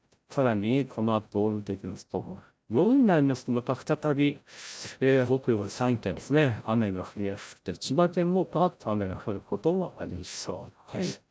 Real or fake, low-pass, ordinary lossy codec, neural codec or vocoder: fake; none; none; codec, 16 kHz, 0.5 kbps, FreqCodec, larger model